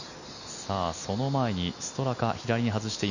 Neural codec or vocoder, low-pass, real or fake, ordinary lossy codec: none; 7.2 kHz; real; none